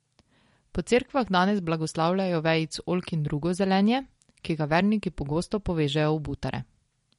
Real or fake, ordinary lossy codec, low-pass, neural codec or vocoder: real; MP3, 48 kbps; 10.8 kHz; none